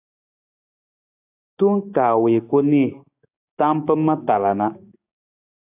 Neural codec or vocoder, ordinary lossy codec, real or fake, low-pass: codec, 16 kHz, 6 kbps, DAC; AAC, 32 kbps; fake; 3.6 kHz